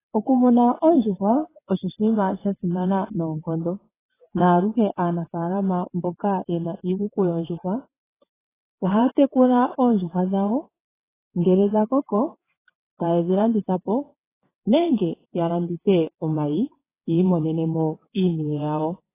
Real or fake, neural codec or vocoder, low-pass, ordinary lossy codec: fake; vocoder, 22.05 kHz, 80 mel bands, WaveNeXt; 3.6 kHz; AAC, 16 kbps